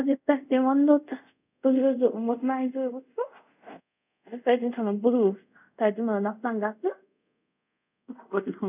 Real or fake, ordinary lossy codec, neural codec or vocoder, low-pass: fake; none; codec, 24 kHz, 0.5 kbps, DualCodec; 3.6 kHz